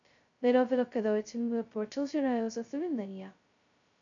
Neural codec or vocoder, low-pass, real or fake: codec, 16 kHz, 0.2 kbps, FocalCodec; 7.2 kHz; fake